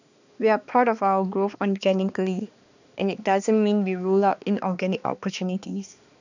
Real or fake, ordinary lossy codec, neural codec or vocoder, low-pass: fake; none; codec, 16 kHz, 2 kbps, X-Codec, HuBERT features, trained on balanced general audio; 7.2 kHz